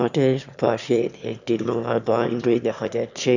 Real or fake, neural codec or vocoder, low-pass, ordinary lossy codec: fake; autoencoder, 22.05 kHz, a latent of 192 numbers a frame, VITS, trained on one speaker; 7.2 kHz; none